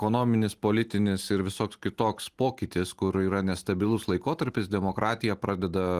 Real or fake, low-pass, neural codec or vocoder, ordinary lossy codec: real; 14.4 kHz; none; Opus, 24 kbps